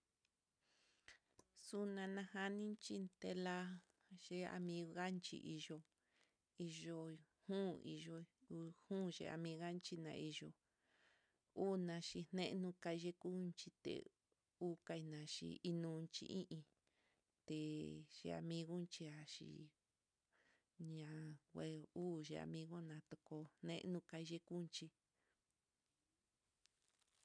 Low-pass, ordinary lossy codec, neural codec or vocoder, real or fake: 9.9 kHz; none; none; real